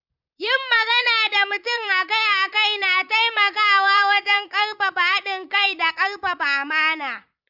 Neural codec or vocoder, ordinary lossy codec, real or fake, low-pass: vocoder, 44.1 kHz, 128 mel bands, Pupu-Vocoder; none; fake; 5.4 kHz